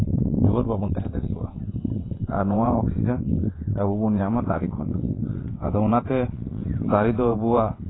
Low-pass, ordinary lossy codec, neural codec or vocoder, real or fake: 7.2 kHz; AAC, 16 kbps; vocoder, 22.05 kHz, 80 mel bands, WaveNeXt; fake